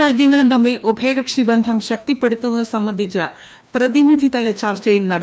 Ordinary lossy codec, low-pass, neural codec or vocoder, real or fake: none; none; codec, 16 kHz, 1 kbps, FreqCodec, larger model; fake